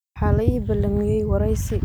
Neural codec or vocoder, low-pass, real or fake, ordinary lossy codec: none; none; real; none